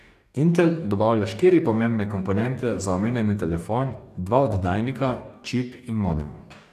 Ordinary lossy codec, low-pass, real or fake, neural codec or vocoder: none; 14.4 kHz; fake; codec, 44.1 kHz, 2.6 kbps, DAC